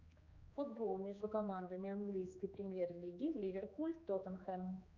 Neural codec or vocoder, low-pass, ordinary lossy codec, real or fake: codec, 16 kHz, 2 kbps, X-Codec, HuBERT features, trained on general audio; 7.2 kHz; Opus, 64 kbps; fake